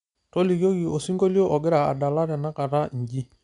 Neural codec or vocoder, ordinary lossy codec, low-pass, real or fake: none; none; 10.8 kHz; real